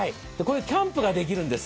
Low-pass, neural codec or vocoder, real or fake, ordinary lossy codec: none; none; real; none